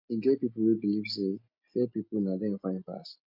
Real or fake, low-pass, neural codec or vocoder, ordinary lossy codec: real; 5.4 kHz; none; none